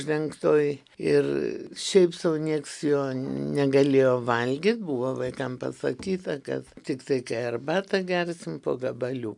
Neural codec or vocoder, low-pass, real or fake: none; 10.8 kHz; real